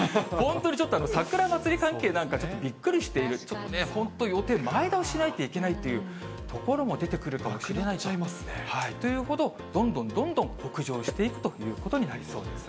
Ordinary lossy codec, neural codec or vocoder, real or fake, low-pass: none; none; real; none